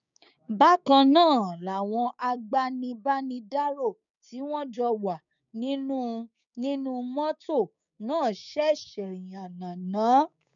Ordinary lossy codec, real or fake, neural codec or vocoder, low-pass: none; fake; codec, 16 kHz, 6 kbps, DAC; 7.2 kHz